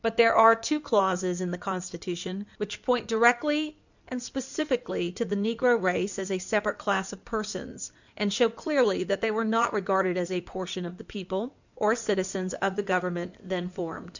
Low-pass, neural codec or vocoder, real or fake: 7.2 kHz; codec, 16 kHz in and 24 kHz out, 2.2 kbps, FireRedTTS-2 codec; fake